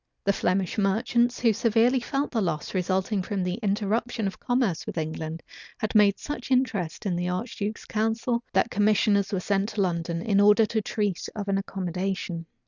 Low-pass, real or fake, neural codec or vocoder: 7.2 kHz; real; none